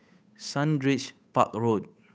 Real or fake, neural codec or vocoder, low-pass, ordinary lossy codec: fake; codec, 16 kHz, 8 kbps, FunCodec, trained on Chinese and English, 25 frames a second; none; none